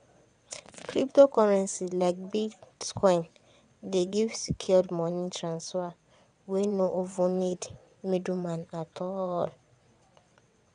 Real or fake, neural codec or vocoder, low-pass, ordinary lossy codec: fake; vocoder, 22.05 kHz, 80 mel bands, WaveNeXt; 9.9 kHz; none